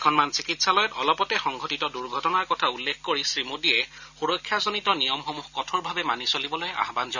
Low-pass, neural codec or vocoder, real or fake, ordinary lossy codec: 7.2 kHz; none; real; none